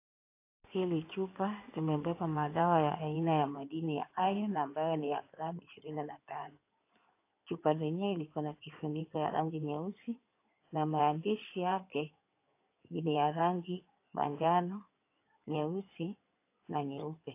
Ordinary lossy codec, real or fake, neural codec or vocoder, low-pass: AAC, 24 kbps; fake; codec, 16 kHz in and 24 kHz out, 2.2 kbps, FireRedTTS-2 codec; 3.6 kHz